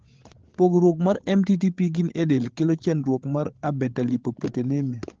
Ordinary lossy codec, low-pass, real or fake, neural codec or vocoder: Opus, 16 kbps; 7.2 kHz; fake; codec, 16 kHz, 8 kbps, FreqCodec, larger model